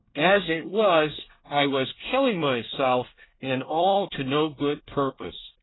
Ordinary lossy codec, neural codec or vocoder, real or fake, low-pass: AAC, 16 kbps; codec, 24 kHz, 1 kbps, SNAC; fake; 7.2 kHz